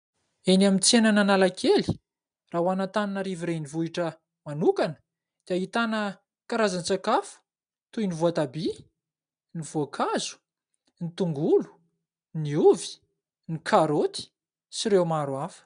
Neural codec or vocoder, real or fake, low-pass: none; real; 9.9 kHz